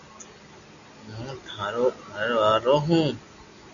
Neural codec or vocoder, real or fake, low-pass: none; real; 7.2 kHz